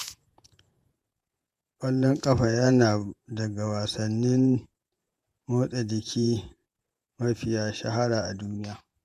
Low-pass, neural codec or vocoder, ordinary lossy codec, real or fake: 14.4 kHz; vocoder, 48 kHz, 128 mel bands, Vocos; MP3, 96 kbps; fake